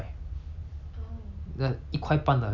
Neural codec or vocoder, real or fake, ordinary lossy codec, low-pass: none; real; none; 7.2 kHz